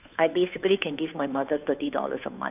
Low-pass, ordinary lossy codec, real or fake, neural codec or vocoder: 3.6 kHz; none; fake; codec, 16 kHz, 2 kbps, FunCodec, trained on Chinese and English, 25 frames a second